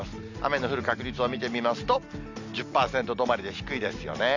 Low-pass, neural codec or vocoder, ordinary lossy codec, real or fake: 7.2 kHz; none; none; real